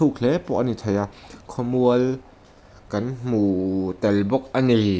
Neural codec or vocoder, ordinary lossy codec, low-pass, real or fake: none; none; none; real